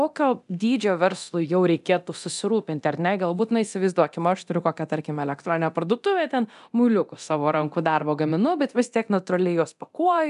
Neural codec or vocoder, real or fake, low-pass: codec, 24 kHz, 0.9 kbps, DualCodec; fake; 10.8 kHz